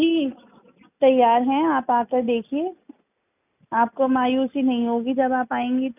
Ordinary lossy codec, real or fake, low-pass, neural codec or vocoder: none; real; 3.6 kHz; none